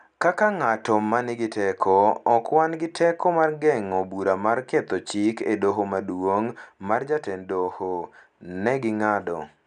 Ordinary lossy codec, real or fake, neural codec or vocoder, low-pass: none; real; none; 9.9 kHz